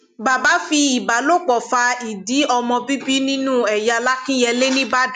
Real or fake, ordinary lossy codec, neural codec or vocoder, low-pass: real; none; none; 14.4 kHz